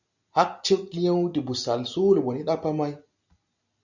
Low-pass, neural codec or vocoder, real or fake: 7.2 kHz; none; real